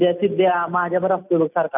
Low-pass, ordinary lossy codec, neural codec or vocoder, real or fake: 3.6 kHz; none; none; real